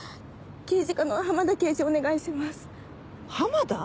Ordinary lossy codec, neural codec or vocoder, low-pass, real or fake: none; none; none; real